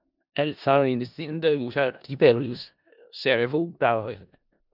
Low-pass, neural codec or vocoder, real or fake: 5.4 kHz; codec, 16 kHz in and 24 kHz out, 0.4 kbps, LongCat-Audio-Codec, four codebook decoder; fake